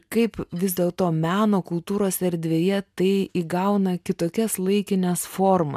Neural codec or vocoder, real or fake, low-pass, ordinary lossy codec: none; real; 14.4 kHz; MP3, 96 kbps